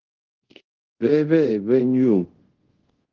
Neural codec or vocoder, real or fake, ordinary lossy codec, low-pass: codec, 24 kHz, 0.5 kbps, DualCodec; fake; Opus, 16 kbps; 7.2 kHz